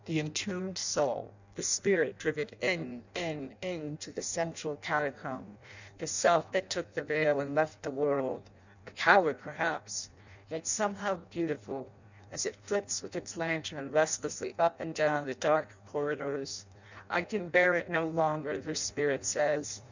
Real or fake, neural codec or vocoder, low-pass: fake; codec, 16 kHz in and 24 kHz out, 0.6 kbps, FireRedTTS-2 codec; 7.2 kHz